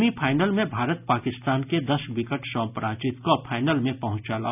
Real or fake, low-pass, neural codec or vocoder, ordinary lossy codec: real; 3.6 kHz; none; none